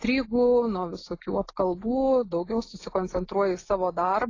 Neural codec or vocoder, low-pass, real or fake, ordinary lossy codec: none; 7.2 kHz; real; AAC, 32 kbps